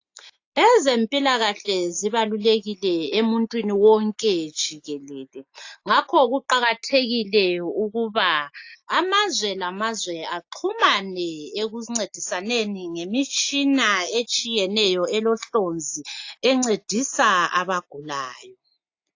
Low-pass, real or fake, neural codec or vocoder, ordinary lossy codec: 7.2 kHz; real; none; AAC, 48 kbps